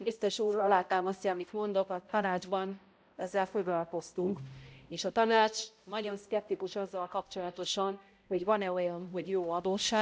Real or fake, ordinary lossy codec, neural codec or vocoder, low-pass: fake; none; codec, 16 kHz, 0.5 kbps, X-Codec, HuBERT features, trained on balanced general audio; none